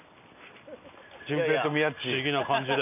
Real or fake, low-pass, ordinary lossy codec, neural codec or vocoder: real; 3.6 kHz; none; none